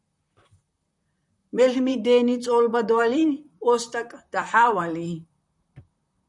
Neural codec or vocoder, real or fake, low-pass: vocoder, 44.1 kHz, 128 mel bands, Pupu-Vocoder; fake; 10.8 kHz